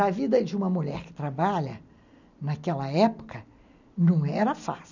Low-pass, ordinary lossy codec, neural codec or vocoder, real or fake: 7.2 kHz; none; none; real